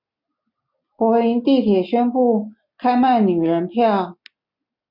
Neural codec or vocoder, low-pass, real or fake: none; 5.4 kHz; real